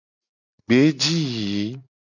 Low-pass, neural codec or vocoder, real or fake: 7.2 kHz; none; real